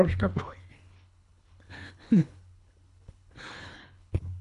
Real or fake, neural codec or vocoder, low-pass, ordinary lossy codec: fake; codec, 24 kHz, 3 kbps, HILCodec; 10.8 kHz; MP3, 64 kbps